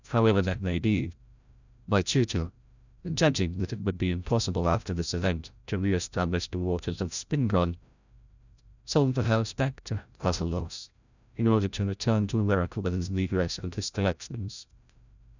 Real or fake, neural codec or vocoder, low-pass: fake; codec, 16 kHz, 0.5 kbps, FreqCodec, larger model; 7.2 kHz